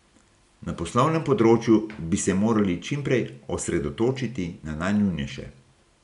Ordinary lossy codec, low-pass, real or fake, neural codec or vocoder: none; 10.8 kHz; real; none